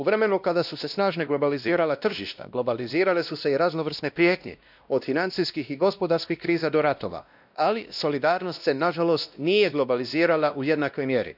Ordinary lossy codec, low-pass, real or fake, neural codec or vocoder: none; 5.4 kHz; fake; codec, 16 kHz, 1 kbps, X-Codec, WavLM features, trained on Multilingual LibriSpeech